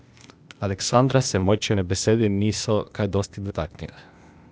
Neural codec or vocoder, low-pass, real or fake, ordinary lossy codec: codec, 16 kHz, 0.8 kbps, ZipCodec; none; fake; none